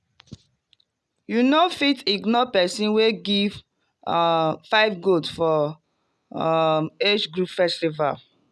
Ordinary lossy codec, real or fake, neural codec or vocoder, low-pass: none; real; none; none